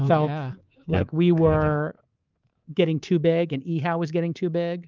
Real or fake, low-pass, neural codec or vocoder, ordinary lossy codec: fake; 7.2 kHz; codec, 24 kHz, 3.1 kbps, DualCodec; Opus, 24 kbps